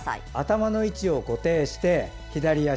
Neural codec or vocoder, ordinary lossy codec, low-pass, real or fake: none; none; none; real